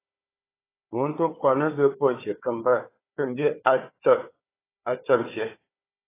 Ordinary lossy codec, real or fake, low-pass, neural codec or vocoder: AAC, 16 kbps; fake; 3.6 kHz; codec, 16 kHz, 4 kbps, FunCodec, trained on Chinese and English, 50 frames a second